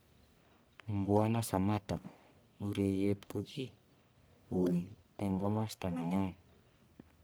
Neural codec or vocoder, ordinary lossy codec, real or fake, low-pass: codec, 44.1 kHz, 1.7 kbps, Pupu-Codec; none; fake; none